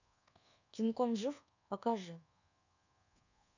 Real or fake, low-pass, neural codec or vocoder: fake; 7.2 kHz; codec, 24 kHz, 1.2 kbps, DualCodec